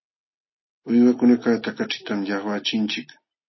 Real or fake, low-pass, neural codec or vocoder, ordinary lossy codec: real; 7.2 kHz; none; MP3, 24 kbps